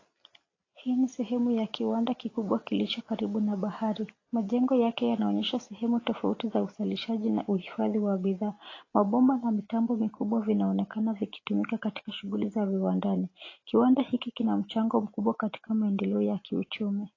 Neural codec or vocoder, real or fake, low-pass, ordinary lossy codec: none; real; 7.2 kHz; AAC, 32 kbps